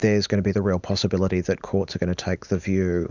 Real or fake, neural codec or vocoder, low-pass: real; none; 7.2 kHz